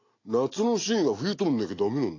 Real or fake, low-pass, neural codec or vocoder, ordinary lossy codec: real; 7.2 kHz; none; AAC, 32 kbps